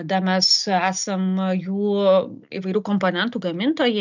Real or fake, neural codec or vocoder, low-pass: real; none; 7.2 kHz